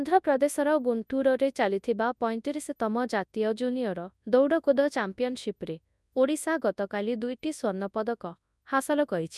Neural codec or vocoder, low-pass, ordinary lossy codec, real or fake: codec, 24 kHz, 0.9 kbps, WavTokenizer, large speech release; none; none; fake